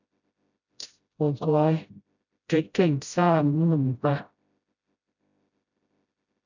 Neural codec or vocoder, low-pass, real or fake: codec, 16 kHz, 0.5 kbps, FreqCodec, smaller model; 7.2 kHz; fake